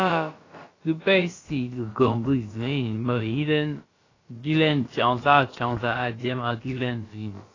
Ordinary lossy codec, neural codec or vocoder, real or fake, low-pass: AAC, 32 kbps; codec, 16 kHz, about 1 kbps, DyCAST, with the encoder's durations; fake; 7.2 kHz